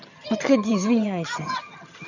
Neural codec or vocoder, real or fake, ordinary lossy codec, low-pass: vocoder, 22.05 kHz, 80 mel bands, HiFi-GAN; fake; none; 7.2 kHz